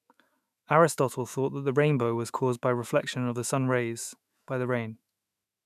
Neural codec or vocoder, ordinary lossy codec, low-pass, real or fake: autoencoder, 48 kHz, 128 numbers a frame, DAC-VAE, trained on Japanese speech; none; 14.4 kHz; fake